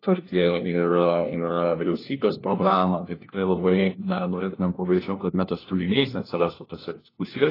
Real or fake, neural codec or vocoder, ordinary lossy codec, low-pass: fake; codec, 16 kHz, 1 kbps, FunCodec, trained on LibriTTS, 50 frames a second; AAC, 24 kbps; 5.4 kHz